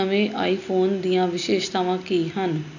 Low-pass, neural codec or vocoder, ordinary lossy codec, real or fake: 7.2 kHz; none; none; real